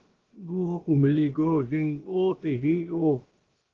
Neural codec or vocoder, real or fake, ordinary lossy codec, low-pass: codec, 16 kHz, about 1 kbps, DyCAST, with the encoder's durations; fake; Opus, 16 kbps; 7.2 kHz